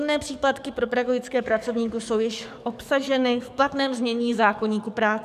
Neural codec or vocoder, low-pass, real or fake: codec, 44.1 kHz, 7.8 kbps, DAC; 14.4 kHz; fake